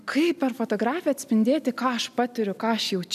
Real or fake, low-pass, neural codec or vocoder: real; 14.4 kHz; none